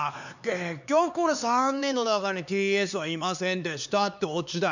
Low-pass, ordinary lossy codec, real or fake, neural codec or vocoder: 7.2 kHz; none; fake; codec, 16 kHz, 4 kbps, X-Codec, HuBERT features, trained on LibriSpeech